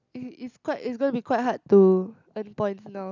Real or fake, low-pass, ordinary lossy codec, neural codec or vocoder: real; 7.2 kHz; none; none